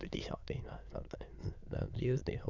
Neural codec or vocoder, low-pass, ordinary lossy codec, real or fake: autoencoder, 22.05 kHz, a latent of 192 numbers a frame, VITS, trained on many speakers; 7.2 kHz; none; fake